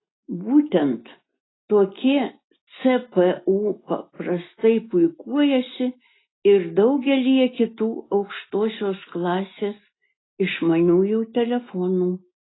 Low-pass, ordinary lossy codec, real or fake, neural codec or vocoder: 7.2 kHz; AAC, 16 kbps; real; none